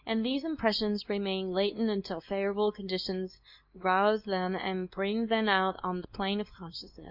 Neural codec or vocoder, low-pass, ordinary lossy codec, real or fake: codec, 24 kHz, 0.9 kbps, WavTokenizer, medium speech release version 2; 5.4 kHz; MP3, 48 kbps; fake